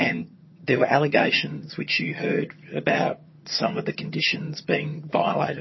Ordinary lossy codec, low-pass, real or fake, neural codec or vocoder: MP3, 24 kbps; 7.2 kHz; fake; vocoder, 22.05 kHz, 80 mel bands, HiFi-GAN